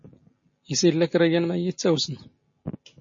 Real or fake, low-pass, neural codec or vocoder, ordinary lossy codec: real; 7.2 kHz; none; MP3, 32 kbps